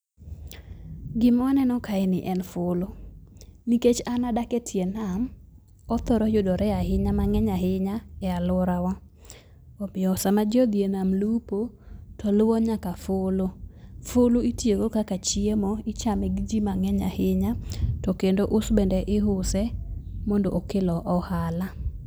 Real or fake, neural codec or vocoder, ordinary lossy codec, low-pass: real; none; none; none